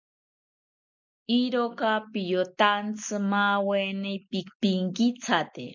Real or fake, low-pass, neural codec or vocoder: real; 7.2 kHz; none